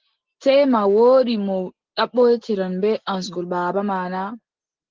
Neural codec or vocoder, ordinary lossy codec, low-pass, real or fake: none; Opus, 16 kbps; 7.2 kHz; real